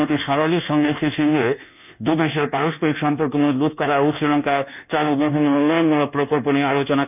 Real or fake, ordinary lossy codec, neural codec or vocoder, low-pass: fake; none; codec, 24 kHz, 1.2 kbps, DualCodec; 3.6 kHz